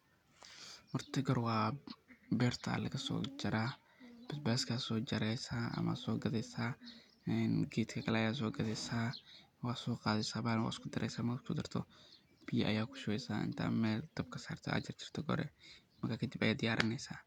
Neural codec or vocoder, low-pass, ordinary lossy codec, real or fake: none; 19.8 kHz; none; real